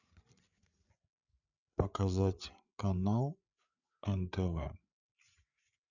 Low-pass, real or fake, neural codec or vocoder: 7.2 kHz; fake; codec, 16 kHz, 8 kbps, FreqCodec, larger model